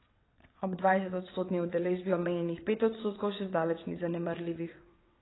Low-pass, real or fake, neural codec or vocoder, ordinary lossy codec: 7.2 kHz; fake; vocoder, 22.05 kHz, 80 mel bands, WaveNeXt; AAC, 16 kbps